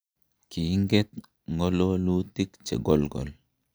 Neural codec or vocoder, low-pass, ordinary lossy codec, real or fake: none; none; none; real